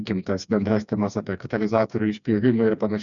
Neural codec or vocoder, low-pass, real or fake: codec, 16 kHz, 2 kbps, FreqCodec, smaller model; 7.2 kHz; fake